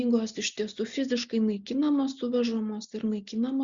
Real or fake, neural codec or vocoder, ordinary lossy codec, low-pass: real; none; Opus, 64 kbps; 7.2 kHz